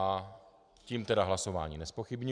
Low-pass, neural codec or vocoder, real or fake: 9.9 kHz; none; real